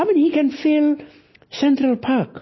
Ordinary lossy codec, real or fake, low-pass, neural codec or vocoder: MP3, 24 kbps; real; 7.2 kHz; none